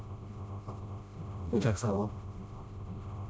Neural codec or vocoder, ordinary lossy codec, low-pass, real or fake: codec, 16 kHz, 0.5 kbps, FreqCodec, smaller model; none; none; fake